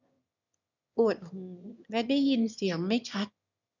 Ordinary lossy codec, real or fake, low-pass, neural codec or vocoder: none; fake; 7.2 kHz; autoencoder, 22.05 kHz, a latent of 192 numbers a frame, VITS, trained on one speaker